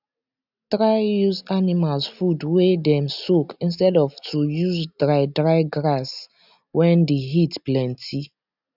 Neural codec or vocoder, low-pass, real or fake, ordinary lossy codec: none; 5.4 kHz; real; none